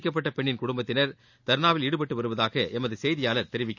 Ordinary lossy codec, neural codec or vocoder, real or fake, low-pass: none; none; real; 7.2 kHz